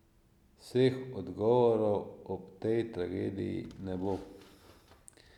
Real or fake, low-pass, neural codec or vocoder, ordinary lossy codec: real; 19.8 kHz; none; none